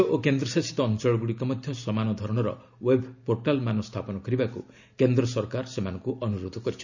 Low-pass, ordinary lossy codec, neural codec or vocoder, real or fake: 7.2 kHz; none; none; real